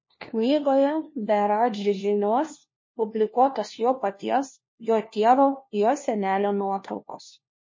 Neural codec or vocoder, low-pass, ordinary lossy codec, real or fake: codec, 16 kHz, 1 kbps, FunCodec, trained on LibriTTS, 50 frames a second; 7.2 kHz; MP3, 32 kbps; fake